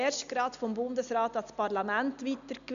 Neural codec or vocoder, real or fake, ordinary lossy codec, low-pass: none; real; MP3, 96 kbps; 7.2 kHz